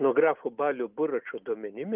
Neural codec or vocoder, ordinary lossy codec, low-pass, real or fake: none; Opus, 32 kbps; 3.6 kHz; real